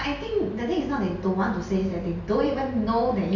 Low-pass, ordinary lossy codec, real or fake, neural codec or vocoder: 7.2 kHz; none; real; none